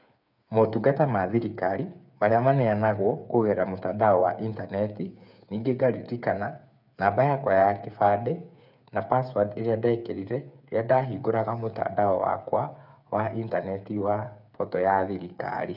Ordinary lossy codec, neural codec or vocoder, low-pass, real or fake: none; codec, 16 kHz, 8 kbps, FreqCodec, smaller model; 5.4 kHz; fake